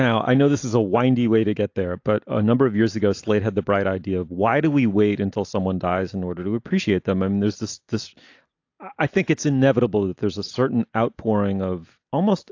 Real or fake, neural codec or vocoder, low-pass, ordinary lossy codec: real; none; 7.2 kHz; AAC, 48 kbps